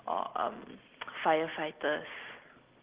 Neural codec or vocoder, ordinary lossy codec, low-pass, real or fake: none; Opus, 16 kbps; 3.6 kHz; real